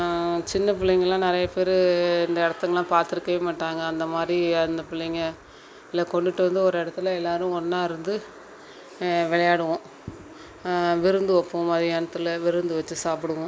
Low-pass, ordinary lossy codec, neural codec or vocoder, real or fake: none; none; none; real